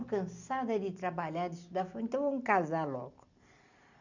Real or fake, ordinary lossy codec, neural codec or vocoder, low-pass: real; none; none; 7.2 kHz